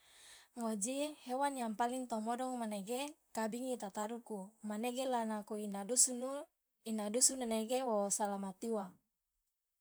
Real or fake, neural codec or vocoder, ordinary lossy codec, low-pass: fake; vocoder, 44.1 kHz, 128 mel bands, Pupu-Vocoder; none; none